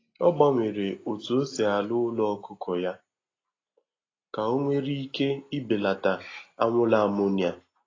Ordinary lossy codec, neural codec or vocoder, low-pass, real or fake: AAC, 32 kbps; none; 7.2 kHz; real